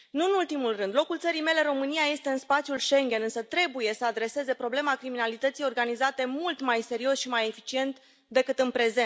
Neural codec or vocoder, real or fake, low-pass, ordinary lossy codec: none; real; none; none